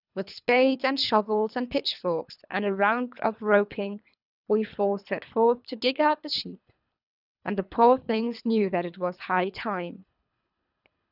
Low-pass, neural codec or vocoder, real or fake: 5.4 kHz; codec, 24 kHz, 3 kbps, HILCodec; fake